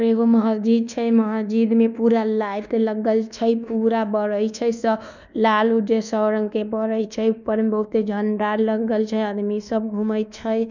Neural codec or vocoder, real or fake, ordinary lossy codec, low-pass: codec, 24 kHz, 1.2 kbps, DualCodec; fake; none; 7.2 kHz